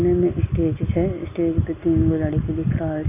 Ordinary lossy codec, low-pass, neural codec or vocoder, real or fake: none; 3.6 kHz; none; real